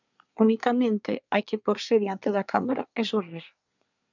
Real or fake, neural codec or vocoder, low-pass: fake; codec, 24 kHz, 1 kbps, SNAC; 7.2 kHz